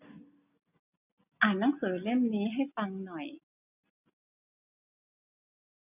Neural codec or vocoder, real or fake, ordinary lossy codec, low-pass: none; real; none; 3.6 kHz